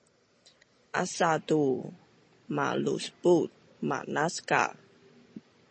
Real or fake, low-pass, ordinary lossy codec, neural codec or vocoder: real; 9.9 kHz; MP3, 32 kbps; none